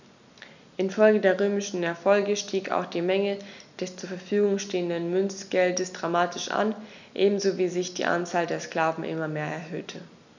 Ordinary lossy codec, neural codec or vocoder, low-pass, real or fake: none; none; 7.2 kHz; real